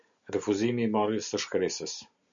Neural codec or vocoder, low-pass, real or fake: none; 7.2 kHz; real